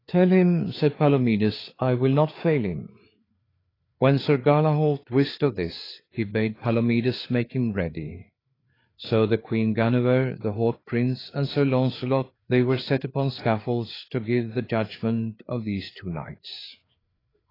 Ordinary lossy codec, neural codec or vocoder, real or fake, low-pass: AAC, 24 kbps; codec, 16 kHz, 4 kbps, FreqCodec, larger model; fake; 5.4 kHz